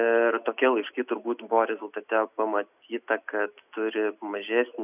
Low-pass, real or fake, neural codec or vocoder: 3.6 kHz; real; none